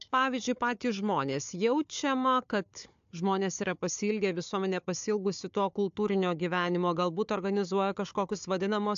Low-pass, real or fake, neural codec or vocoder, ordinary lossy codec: 7.2 kHz; fake; codec, 16 kHz, 4 kbps, FunCodec, trained on Chinese and English, 50 frames a second; AAC, 64 kbps